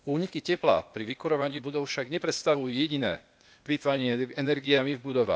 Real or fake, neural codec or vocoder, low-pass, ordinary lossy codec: fake; codec, 16 kHz, 0.8 kbps, ZipCodec; none; none